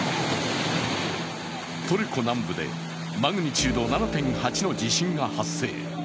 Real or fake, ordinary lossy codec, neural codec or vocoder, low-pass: real; none; none; none